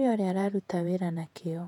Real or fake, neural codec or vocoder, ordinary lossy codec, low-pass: fake; vocoder, 48 kHz, 128 mel bands, Vocos; none; 19.8 kHz